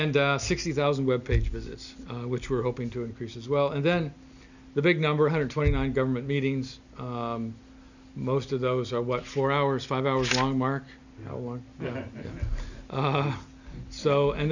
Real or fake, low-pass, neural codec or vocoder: real; 7.2 kHz; none